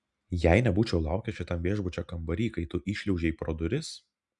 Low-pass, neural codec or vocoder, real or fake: 10.8 kHz; none; real